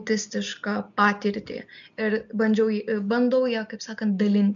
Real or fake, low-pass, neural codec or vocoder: real; 7.2 kHz; none